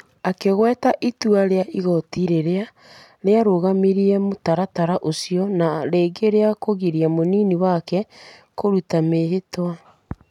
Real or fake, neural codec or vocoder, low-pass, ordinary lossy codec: real; none; 19.8 kHz; none